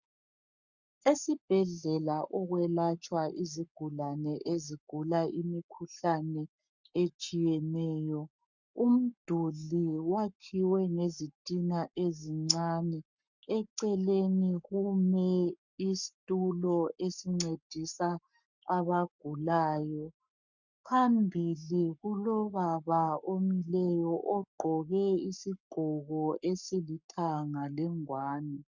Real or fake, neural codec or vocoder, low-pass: fake; codec, 44.1 kHz, 7.8 kbps, Pupu-Codec; 7.2 kHz